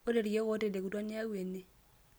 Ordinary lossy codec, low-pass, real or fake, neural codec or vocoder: none; none; real; none